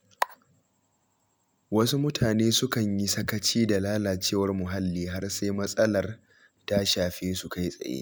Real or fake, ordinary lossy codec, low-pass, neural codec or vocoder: real; none; none; none